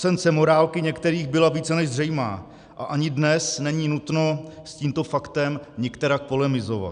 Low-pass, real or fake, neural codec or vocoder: 9.9 kHz; real; none